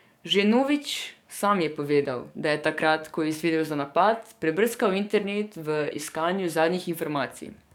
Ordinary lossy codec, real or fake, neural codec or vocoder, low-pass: none; fake; codec, 44.1 kHz, 7.8 kbps, DAC; 19.8 kHz